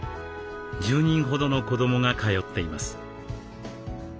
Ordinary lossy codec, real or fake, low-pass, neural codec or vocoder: none; real; none; none